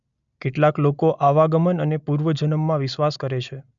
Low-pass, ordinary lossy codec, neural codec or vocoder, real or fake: 7.2 kHz; none; none; real